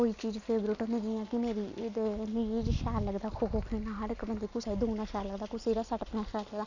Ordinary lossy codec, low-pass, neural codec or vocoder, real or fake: none; 7.2 kHz; none; real